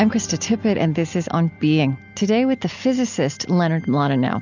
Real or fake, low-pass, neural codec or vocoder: real; 7.2 kHz; none